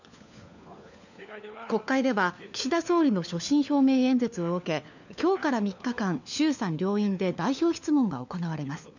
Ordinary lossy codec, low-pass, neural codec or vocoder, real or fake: none; 7.2 kHz; codec, 16 kHz, 4 kbps, FunCodec, trained on LibriTTS, 50 frames a second; fake